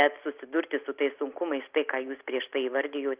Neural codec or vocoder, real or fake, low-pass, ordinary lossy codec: none; real; 3.6 kHz; Opus, 32 kbps